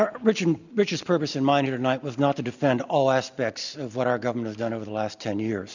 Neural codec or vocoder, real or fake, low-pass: none; real; 7.2 kHz